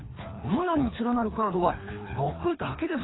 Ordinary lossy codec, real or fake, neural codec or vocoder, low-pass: AAC, 16 kbps; fake; codec, 24 kHz, 3 kbps, HILCodec; 7.2 kHz